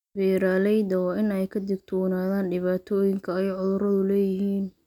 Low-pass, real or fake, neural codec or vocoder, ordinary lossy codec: 19.8 kHz; real; none; none